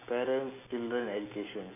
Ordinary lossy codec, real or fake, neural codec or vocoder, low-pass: none; real; none; 3.6 kHz